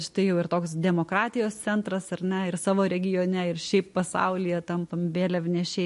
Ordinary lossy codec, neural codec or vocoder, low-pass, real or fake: MP3, 48 kbps; none; 14.4 kHz; real